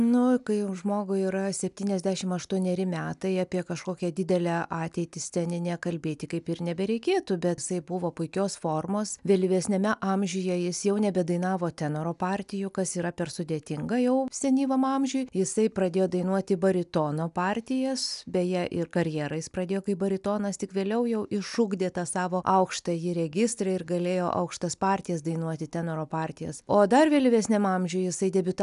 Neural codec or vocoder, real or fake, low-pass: none; real; 10.8 kHz